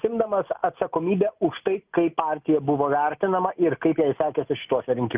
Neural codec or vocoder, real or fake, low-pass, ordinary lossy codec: none; real; 3.6 kHz; Opus, 24 kbps